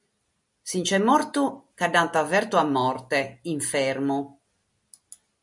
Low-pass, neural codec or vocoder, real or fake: 10.8 kHz; none; real